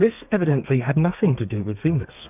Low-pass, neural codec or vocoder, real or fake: 3.6 kHz; codec, 32 kHz, 1.9 kbps, SNAC; fake